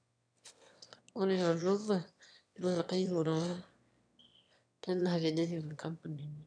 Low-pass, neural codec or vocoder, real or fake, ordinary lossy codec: 9.9 kHz; autoencoder, 22.05 kHz, a latent of 192 numbers a frame, VITS, trained on one speaker; fake; none